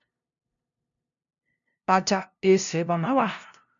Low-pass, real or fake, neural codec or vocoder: 7.2 kHz; fake; codec, 16 kHz, 0.5 kbps, FunCodec, trained on LibriTTS, 25 frames a second